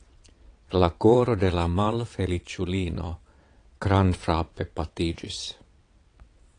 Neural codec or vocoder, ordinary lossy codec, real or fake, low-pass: vocoder, 22.05 kHz, 80 mel bands, WaveNeXt; AAC, 48 kbps; fake; 9.9 kHz